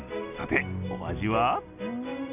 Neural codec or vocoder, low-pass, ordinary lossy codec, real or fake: none; 3.6 kHz; none; real